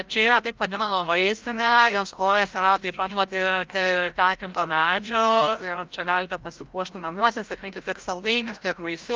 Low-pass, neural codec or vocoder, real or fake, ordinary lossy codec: 7.2 kHz; codec, 16 kHz, 0.5 kbps, FreqCodec, larger model; fake; Opus, 24 kbps